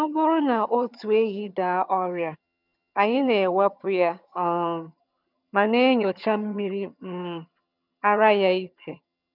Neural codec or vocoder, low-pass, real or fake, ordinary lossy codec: vocoder, 22.05 kHz, 80 mel bands, HiFi-GAN; 5.4 kHz; fake; none